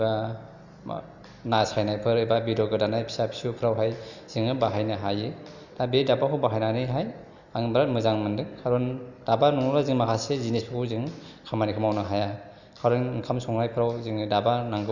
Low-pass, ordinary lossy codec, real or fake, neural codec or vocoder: 7.2 kHz; none; real; none